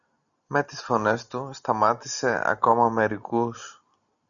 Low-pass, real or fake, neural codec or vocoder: 7.2 kHz; real; none